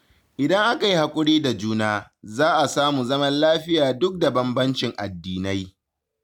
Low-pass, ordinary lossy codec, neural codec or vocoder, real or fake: 19.8 kHz; none; none; real